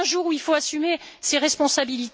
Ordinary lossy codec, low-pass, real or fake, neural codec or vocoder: none; none; real; none